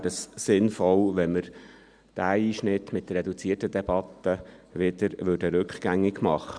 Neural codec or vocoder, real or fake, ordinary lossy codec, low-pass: none; real; none; 9.9 kHz